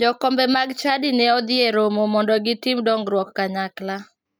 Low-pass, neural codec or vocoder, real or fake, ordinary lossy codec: none; none; real; none